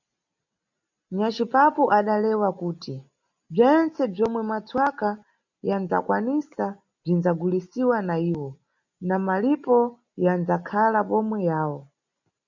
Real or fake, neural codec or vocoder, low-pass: real; none; 7.2 kHz